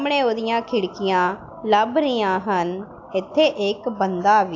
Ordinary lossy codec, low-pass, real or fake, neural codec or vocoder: AAC, 48 kbps; 7.2 kHz; real; none